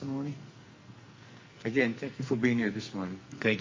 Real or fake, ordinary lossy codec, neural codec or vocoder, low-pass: fake; MP3, 32 kbps; codec, 44.1 kHz, 2.6 kbps, SNAC; 7.2 kHz